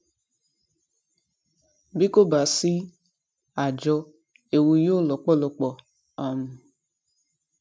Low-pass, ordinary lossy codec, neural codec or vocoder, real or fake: none; none; none; real